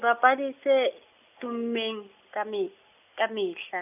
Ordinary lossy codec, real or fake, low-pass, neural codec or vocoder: none; real; 3.6 kHz; none